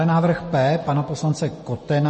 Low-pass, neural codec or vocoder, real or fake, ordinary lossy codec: 10.8 kHz; none; real; MP3, 32 kbps